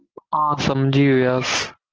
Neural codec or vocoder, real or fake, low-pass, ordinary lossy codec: none; real; 7.2 kHz; Opus, 16 kbps